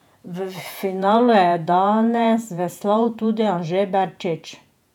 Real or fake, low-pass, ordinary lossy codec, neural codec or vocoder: fake; 19.8 kHz; none; vocoder, 48 kHz, 128 mel bands, Vocos